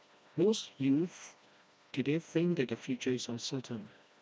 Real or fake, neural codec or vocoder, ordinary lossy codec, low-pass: fake; codec, 16 kHz, 1 kbps, FreqCodec, smaller model; none; none